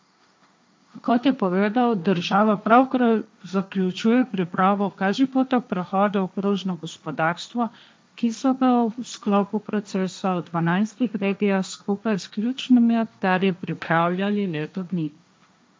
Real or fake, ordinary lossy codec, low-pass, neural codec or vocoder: fake; none; none; codec, 16 kHz, 1.1 kbps, Voila-Tokenizer